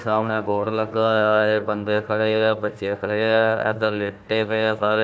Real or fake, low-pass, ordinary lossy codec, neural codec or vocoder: fake; none; none; codec, 16 kHz, 1 kbps, FunCodec, trained on Chinese and English, 50 frames a second